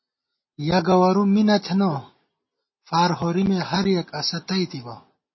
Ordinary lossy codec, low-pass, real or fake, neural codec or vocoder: MP3, 24 kbps; 7.2 kHz; real; none